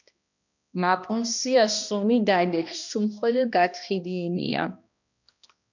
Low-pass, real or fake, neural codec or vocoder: 7.2 kHz; fake; codec, 16 kHz, 1 kbps, X-Codec, HuBERT features, trained on balanced general audio